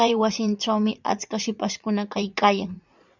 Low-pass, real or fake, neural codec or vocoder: 7.2 kHz; fake; vocoder, 44.1 kHz, 80 mel bands, Vocos